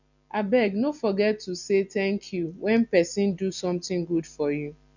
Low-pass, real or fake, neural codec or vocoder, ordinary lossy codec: 7.2 kHz; real; none; none